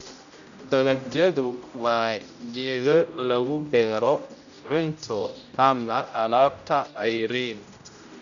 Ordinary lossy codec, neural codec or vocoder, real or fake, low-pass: none; codec, 16 kHz, 0.5 kbps, X-Codec, HuBERT features, trained on general audio; fake; 7.2 kHz